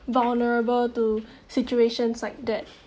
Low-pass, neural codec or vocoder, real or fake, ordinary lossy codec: none; none; real; none